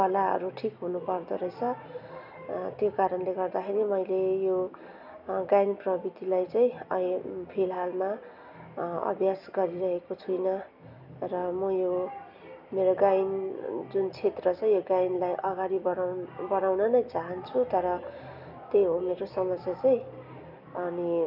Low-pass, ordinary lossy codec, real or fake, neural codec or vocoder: 5.4 kHz; none; real; none